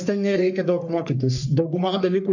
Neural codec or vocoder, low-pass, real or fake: codec, 44.1 kHz, 3.4 kbps, Pupu-Codec; 7.2 kHz; fake